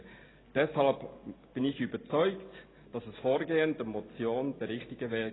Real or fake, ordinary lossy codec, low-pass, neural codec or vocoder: real; AAC, 16 kbps; 7.2 kHz; none